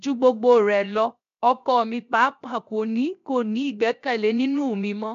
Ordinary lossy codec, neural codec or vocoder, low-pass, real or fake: none; codec, 16 kHz, 0.7 kbps, FocalCodec; 7.2 kHz; fake